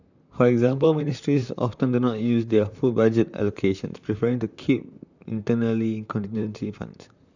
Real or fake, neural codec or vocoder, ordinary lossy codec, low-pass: fake; vocoder, 44.1 kHz, 128 mel bands, Pupu-Vocoder; none; 7.2 kHz